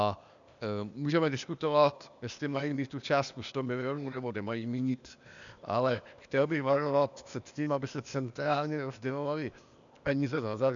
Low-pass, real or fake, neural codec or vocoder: 7.2 kHz; fake; codec, 16 kHz, 0.8 kbps, ZipCodec